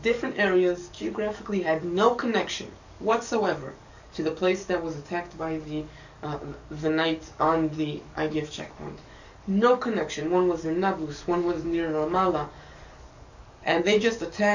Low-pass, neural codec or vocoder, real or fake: 7.2 kHz; codec, 44.1 kHz, 7.8 kbps, DAC; fake